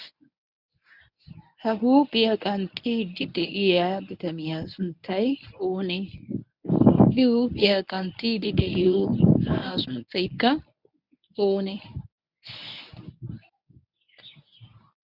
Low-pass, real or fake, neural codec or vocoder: 5.4 kHz; fake; codec, 24 kHz, 0.9 kbps, WavTokenizer, medium speech release version 1